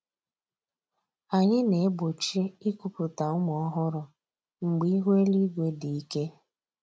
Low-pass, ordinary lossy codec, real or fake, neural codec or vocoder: none; none; real; none